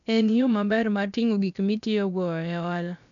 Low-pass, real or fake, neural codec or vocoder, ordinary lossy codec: 7.2 kHz; fake; codec, 16 kHz, about 1 kbps, DyCAST, with the encoder's durations; none